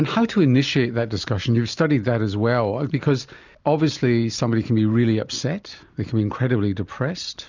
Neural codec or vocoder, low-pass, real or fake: none; 7.2 kHz; real